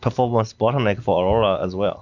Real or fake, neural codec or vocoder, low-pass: real; none; 7.2 kHz